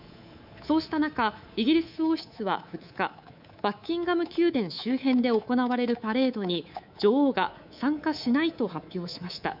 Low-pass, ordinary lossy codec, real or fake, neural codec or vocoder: 5.4 kHz; none; fake; codec, 24 kHz, 3.1 kbps, DualCodec